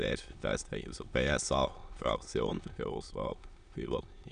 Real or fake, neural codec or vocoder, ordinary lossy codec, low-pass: fake; autoencoder, 22.05 kHz, a latent of 192 numbers a frame, VITS, trained on many speakers; none; 9.9 kHz